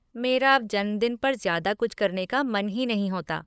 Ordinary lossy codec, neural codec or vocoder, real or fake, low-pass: none; codec, 16 kHz, 8 kbps, FunCodec, trained on LibriTTS, 25 frames a second; fake; none